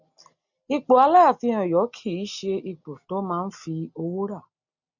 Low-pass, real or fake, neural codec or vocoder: 7.2 kHz; real; none